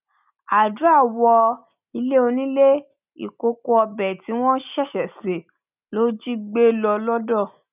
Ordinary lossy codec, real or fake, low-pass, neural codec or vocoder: none; real; 3.6 kHz; none